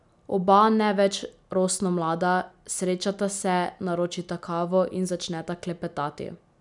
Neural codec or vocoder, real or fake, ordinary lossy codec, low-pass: none; real; none; 10.8 kHz